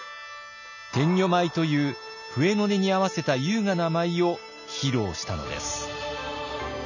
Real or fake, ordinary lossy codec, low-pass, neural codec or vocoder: real; none; 7.2 kHz; none